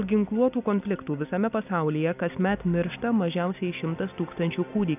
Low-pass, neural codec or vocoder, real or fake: 3.6 kHz; none; real